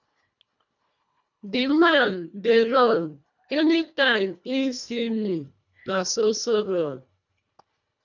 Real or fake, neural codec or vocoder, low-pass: fake; codec, 24 kHz, 1.5 kbps, HILCodec; 7.2 kHz